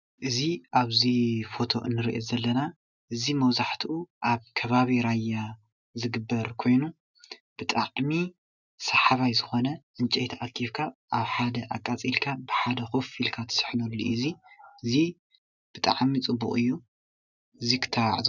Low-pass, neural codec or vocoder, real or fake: 7.2 kHz; none; real